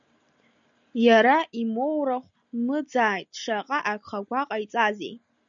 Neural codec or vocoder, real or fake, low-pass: none; real; 7.2 kHz